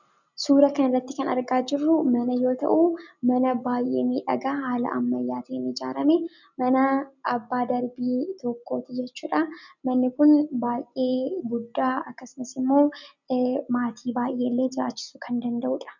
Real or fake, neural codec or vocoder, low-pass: real; none; 7.2 kHz